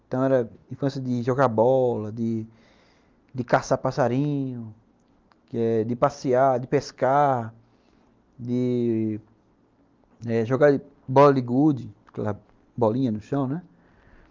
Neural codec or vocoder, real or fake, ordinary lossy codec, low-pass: none; real; Opus, 24 kbps; 7.2 kHz